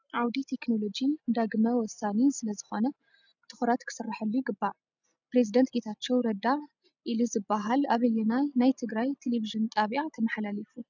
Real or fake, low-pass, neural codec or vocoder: real; 7.2 kHz; none